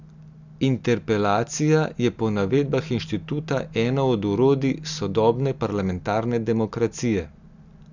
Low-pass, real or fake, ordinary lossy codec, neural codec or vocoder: 7.2 kHz; real; none; none